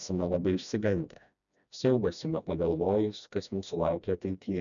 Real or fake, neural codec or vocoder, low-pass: fake; codec, 16 kHz, 1 kbps, FreqCodec, smaller model; 7.2 kHz